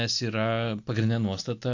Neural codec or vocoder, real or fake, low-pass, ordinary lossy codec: none; real; 7.2 kHz; AAC, 48 kbps